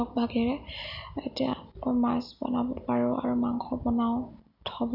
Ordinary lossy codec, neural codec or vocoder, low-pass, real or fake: none; none; 5.4 kHz; real